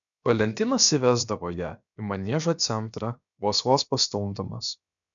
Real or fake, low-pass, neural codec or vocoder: fake; 7.2 kHz; codec, 16 kHz, about 1 kbps, DyCAST, with the encoder's durations